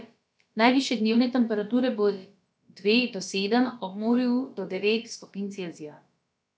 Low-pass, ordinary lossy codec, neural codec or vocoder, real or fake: none; none; codec, 16 kHz, about 1 kbps, DyCAST, with the encoder's durations; fake